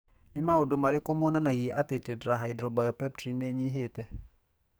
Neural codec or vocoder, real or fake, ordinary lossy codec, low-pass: codec, 44.1 kHz, 2.6 kbps, SNAC; fake; none; none